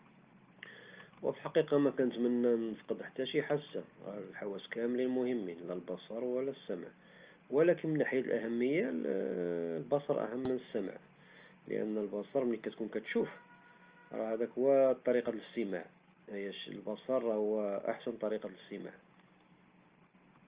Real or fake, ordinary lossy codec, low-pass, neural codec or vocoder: real; Opus, 24 kbps; 3.6 kHz; none